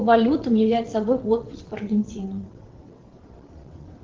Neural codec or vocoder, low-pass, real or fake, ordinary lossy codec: vocoder, 22.05 kHz, 80 mel bands, WaveNeXt; 7.2 kHz; fake; Opus, 16 kbps